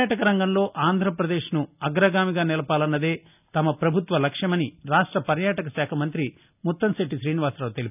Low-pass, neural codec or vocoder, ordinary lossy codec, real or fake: 3.6 kHz; none; none; real